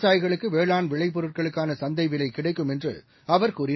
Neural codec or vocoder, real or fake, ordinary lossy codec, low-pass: none; real; MP3, 24 kbps; 7.2 kHz